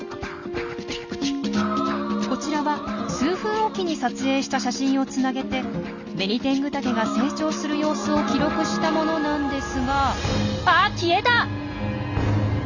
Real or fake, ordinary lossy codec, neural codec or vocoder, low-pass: real; none; none; 7.2 kHz